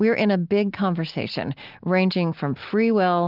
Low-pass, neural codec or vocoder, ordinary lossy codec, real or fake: 5.4 kHz; none; Opus, 32 kbps; real